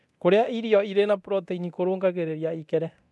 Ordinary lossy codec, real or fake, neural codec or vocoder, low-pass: none; fake; codec, 24 kHz, 0.9 kbps, DualCodec; none